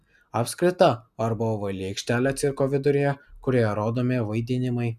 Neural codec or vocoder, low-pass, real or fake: none; 14.4 kHz; real